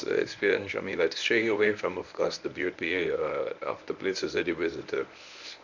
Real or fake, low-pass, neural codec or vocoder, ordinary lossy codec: fake; 7.2 kHz; codec, 24 kHz, 0.9 kbps, WavTokenizer, medium speech release version 1; none